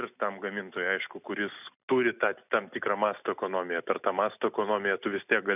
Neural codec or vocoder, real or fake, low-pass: none; real; 3.6 kHz